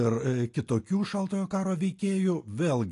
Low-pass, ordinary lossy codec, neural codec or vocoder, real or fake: 10.8 kHz; AAC, 48 kbps; none; real